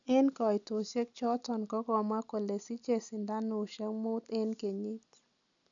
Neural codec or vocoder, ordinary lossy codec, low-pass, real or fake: none; none; 7.2 kHz; real